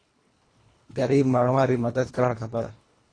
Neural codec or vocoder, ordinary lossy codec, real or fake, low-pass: codec, 24 kHz, 1.5 kbps, HILCodec; AAC, 32 kbps; fake; 9.9 kHz